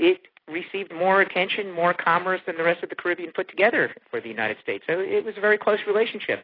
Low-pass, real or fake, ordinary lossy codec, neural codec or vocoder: 5.4 kHz; real; AAC, 24 kbps; none